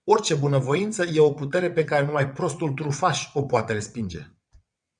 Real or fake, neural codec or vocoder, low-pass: fake; vocoder, 22.05 kHz, 80 mel bands, WaveNeXt; 9.9 kHz